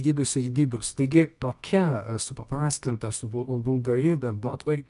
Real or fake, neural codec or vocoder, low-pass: fake; codec, 24 kHz, 0.9 kbps, WavTokenizer, medium music audio release; 10.8 kHz